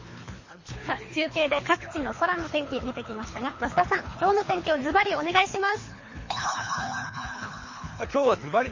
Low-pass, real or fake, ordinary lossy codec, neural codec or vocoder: 7.2 kHz; fake; MP3, 32 kbps; codec, 24 kHz, 3 kbps, HILCodec